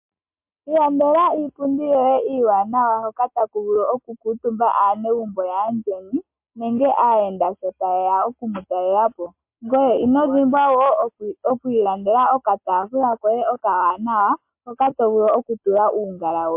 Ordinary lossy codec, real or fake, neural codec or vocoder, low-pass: MP3, 32 kbps; real; none; 3.6 kHz